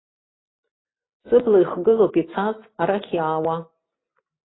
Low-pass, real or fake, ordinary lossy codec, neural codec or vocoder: 7.2 kHz; real; AAC, 16 kbps; none